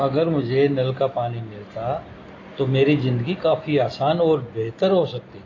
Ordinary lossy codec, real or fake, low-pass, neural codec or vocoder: AAC, 32 kbps; real; 7.2 kHz; none